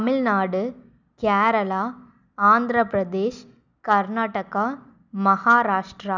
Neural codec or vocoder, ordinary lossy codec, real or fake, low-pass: none; none; real; 7.2 kHz